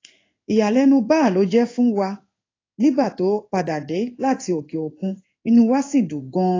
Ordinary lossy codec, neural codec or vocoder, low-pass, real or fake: AAC, 32 kbps; codec, 16 kHz in and 24 kHz out, 1 kbps, XY-Tokenizer; 7.2 kHz; fake